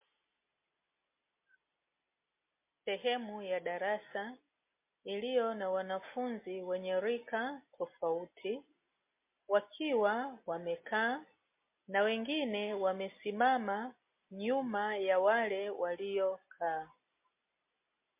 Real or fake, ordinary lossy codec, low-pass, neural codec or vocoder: real; MP3, 24 kbps; 3.6 kHz; none